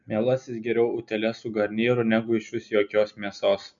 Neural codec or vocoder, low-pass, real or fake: none; 7.2 kHz; real